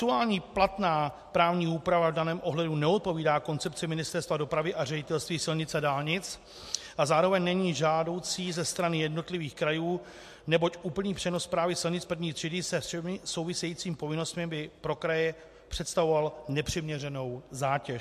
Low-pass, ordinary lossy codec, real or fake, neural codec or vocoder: 14.4 kHz; MP3, 64 kbps; real; none